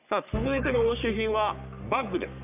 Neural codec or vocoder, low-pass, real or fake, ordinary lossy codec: codec, 44.1 kHz, 3.4 kbps, Pupu-Codec; 3.6 kHz; fake; none